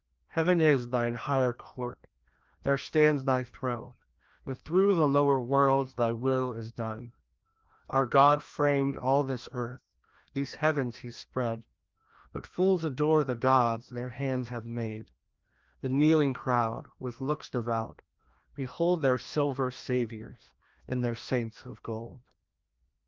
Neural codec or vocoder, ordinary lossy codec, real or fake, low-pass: codec, 16 kHz, 1 kbps, FreqCodec, larger model; Opus, 32 kbps; fake; 7.2 kHz